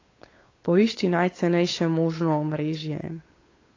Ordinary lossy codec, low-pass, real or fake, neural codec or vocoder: AAC, 32 kbps; 7.2 kHz; fake; codec, 16 kHz, 8 kbps, FunCodec, trained on Chinese and English, 25 frames a second